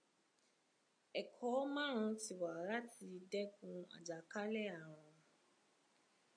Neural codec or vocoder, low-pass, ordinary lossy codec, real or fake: none; 9.9 kHz; AAC, 64 kbps; real